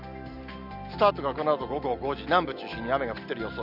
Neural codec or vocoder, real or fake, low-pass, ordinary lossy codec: none; real; 5.4 kHz; none